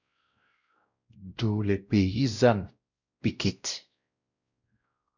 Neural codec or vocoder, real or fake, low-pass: codec, 16 kHz, 0.5 kbps, X-Codec, WavLM features, trained on Multilingual LibriSpeech; fake; 7.2 kHz